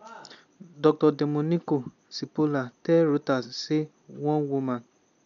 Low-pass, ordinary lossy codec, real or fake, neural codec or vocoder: 7.2 kHz; none; real; none